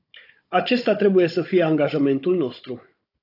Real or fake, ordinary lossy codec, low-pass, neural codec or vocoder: fake; AAC, 32 kbps; 5.4 kHz; codec, 16 kHz, 16 kbps, FunCodec, trained on Chinese and English, 50 frames a second